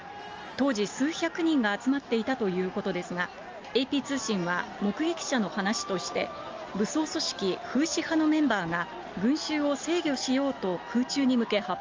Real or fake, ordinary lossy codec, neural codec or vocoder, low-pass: real; Opus, 24 kbps; none; 7.2 kHz